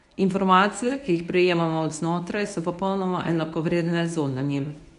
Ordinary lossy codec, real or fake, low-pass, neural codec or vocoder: none; fake; 10.8 kHz; codec, 24 kHz, 0.9 kbps, WavTokenizer, medium speech release version 1